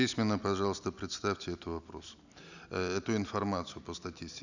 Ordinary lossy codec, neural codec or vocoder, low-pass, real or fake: none; none; 7.2 kHz; real